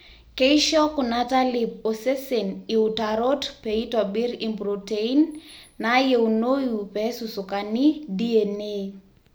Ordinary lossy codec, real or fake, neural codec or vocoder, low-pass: none; real; none; none